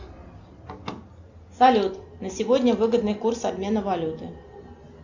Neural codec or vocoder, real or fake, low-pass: none; real; 7.2 kHz